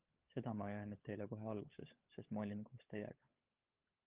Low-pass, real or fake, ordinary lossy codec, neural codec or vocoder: 3.6 kHz; fake; Opus, 32 kbps; codec, 16 kHz, 16 kbps, FunCodec, trained on LibriTTS, 50 frames a second